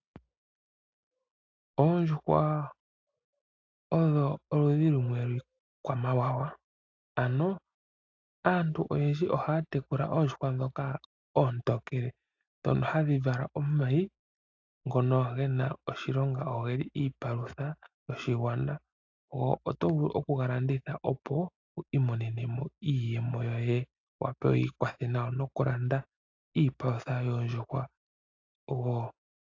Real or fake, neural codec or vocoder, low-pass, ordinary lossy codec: real; none; 7.2 kHz; AAC, 48 kbps